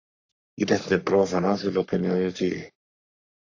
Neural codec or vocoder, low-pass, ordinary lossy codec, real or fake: codec, 44.1 kHz, 3.4 kbps, Pupu-Codec; 7.2 kHz; AAC, 48 kbps; fake